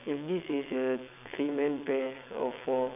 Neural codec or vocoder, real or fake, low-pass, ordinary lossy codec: vocoder, 22.05 kHz, 80 mel bands, WaveNeXt; fake; 3.6 kHz; none